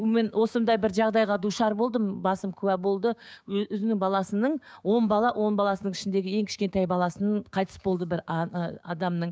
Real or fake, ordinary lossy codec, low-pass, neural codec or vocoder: fake; none; none; codec, 16 kHz, 6 kbps, DAC